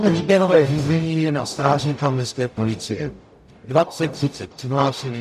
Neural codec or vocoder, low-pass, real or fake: codec, 44.1 kHz, 0.9 kbps, DAC; 14.4 kHz; fake